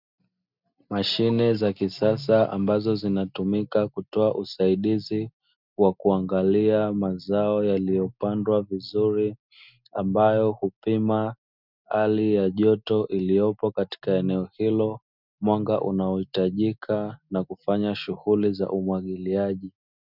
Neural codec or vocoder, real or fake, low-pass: none; real; 5.4 kHz